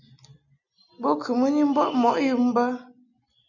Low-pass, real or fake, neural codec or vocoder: 7.2 kHz; real; none